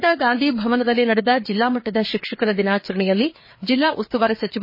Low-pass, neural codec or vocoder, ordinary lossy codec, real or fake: 5.4 kHz; codec, 16 kHz, 4 kbps, FreqCodec, larger model; MP3, 24 kbps; fake